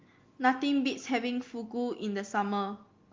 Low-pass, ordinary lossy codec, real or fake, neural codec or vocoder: 7.2 kHz; Opus, 32 kbps; real; none